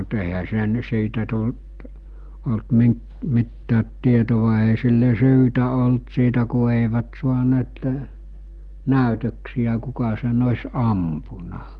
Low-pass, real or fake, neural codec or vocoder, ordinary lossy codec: 10.8 kHz; real; none; Opus, 24 kbps